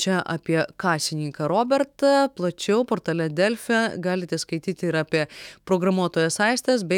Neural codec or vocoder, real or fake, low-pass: autoencoder, 48 kHz, 128 numbers a frame, DAC-VAE, trained on Japanese speech; fake; 19.8 kHz